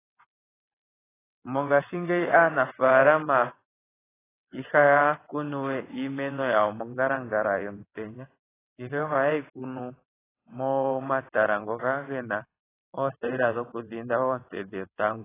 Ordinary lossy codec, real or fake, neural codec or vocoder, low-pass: AAC, 16 kbps; fake; vocoder, 22.05 kHz, 80 mel bands, WaveNeXt; 3.6 kHz